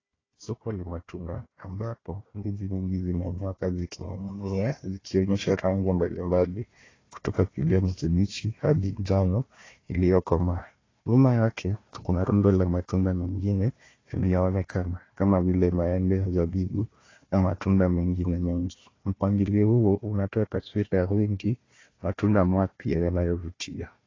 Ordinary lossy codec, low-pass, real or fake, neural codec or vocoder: AAC, 32 kbps; 7.2 kHz; fake; codec, 16 kHz, 1 kbps, FunCodec, trained on Chinese and English, 50 frames a second